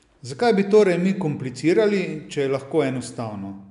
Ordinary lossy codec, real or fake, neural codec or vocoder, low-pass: AAC, 96 kbps; real; none; 10.8 kHz